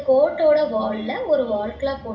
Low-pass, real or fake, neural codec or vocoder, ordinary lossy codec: 7.2 kHz; real; none; none